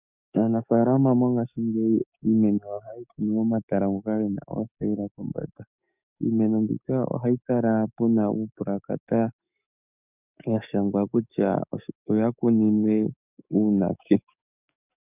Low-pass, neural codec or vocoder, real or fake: 3.6 kHz; codec, 44.1 kHz, 7.8 kbps, DAC; fake